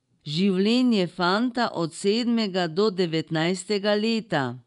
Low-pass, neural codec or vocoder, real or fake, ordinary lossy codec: 10.8 kHz; vocoder, 24 kHz, 100 mel bands, Vocos; fake; none